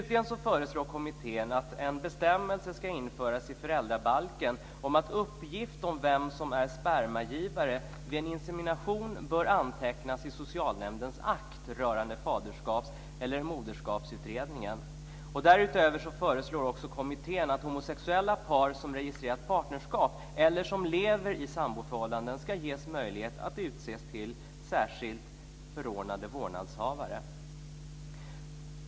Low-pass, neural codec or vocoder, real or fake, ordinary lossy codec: none; none; real; none